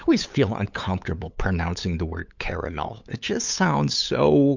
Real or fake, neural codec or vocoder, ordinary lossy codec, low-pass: fake; codec, 16 kHz, 8 kbps, FunCodec, trained on LibriTTS, 25 frames a second; MP3, 64 kbps; 7.2 kHz